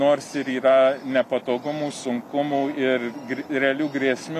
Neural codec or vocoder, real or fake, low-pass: none; real; 14.4 kHz